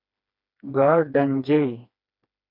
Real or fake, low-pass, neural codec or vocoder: fake; 5.4 kHz; codec, 16 kHz, 2 kbps, FreqCodec, smaller model